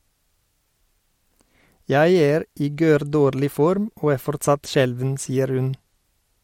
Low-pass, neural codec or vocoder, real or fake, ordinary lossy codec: 19.8 kHz; none; real; MP3, 64 kbps